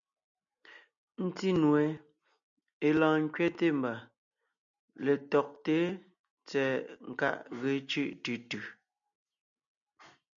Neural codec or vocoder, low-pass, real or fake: none; 7.2 kHz; real